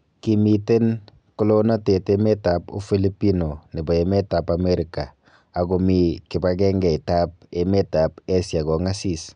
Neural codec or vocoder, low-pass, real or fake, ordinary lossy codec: none; 9.9 kHz; real; none